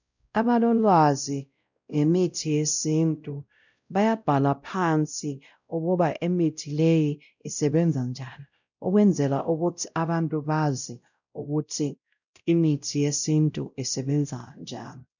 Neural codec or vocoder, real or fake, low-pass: codec, 16 kHz, 0.5 kbps, X-Codec, WavLM features, trained on Multilingual LibriSpeech; fake; 7.2 kHz